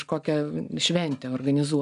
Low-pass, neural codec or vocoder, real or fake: 10.8 kHz; none; real